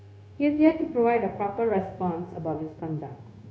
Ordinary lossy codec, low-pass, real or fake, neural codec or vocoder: none; none; fake; codec, 16 kHz, 0.9 kbps, LongCat-Audio-Codec